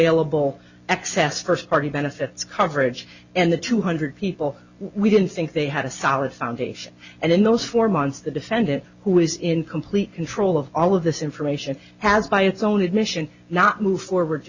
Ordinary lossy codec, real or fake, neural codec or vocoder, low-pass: Opus, 64 kbps; real; none; 7.2 kHz